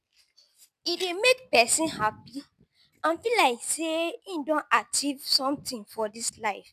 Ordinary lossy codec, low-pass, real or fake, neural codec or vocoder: none; 14.4 kHz; real; none